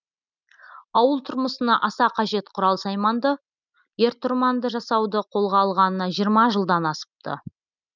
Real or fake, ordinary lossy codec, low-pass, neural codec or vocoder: real; none; 7.2 kHz; none